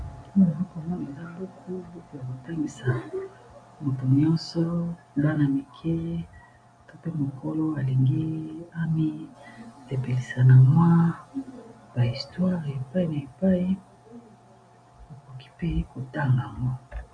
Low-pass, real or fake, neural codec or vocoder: 9.9 kHz; real; none